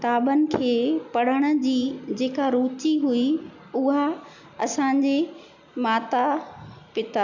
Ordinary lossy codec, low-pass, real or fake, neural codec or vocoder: none; 7.2 kHz; real; none